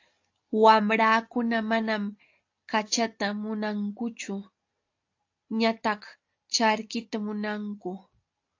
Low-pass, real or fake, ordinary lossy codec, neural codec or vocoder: 7.2 kHz; real; AAC, 48 kbps; none